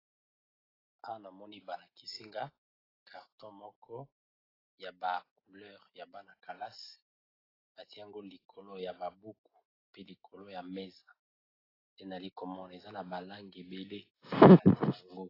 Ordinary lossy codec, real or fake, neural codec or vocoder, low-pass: AAC, 24 kbps; real; none; 5.4 kHz